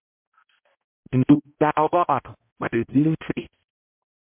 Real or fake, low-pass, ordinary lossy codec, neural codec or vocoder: fake; 3.6 kHz; MP3, 32 kbps; codec, 16 kHz, 0.5 kbps, X-Codec, HuBERT features, trained on balanced general audio